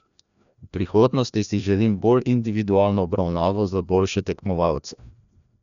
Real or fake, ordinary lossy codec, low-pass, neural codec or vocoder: fake; none; 7.2 kHz; codec, 16 kHz, 1 kbps, FreqCodec, larger model